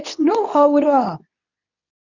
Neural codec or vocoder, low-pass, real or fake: codec, 24 kHz, 0.9 kbps, WavTokenizer, medium speech release version 2; 7.2 kHz; fake